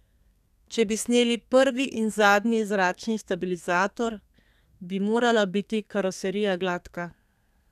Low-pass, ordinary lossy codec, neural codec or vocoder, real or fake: 14.4 kHz; none; codec, 32 kHz, 1.9 kbps, SNAC; fake